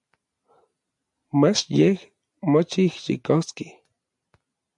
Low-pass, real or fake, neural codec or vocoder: 10.8 kHz; real; none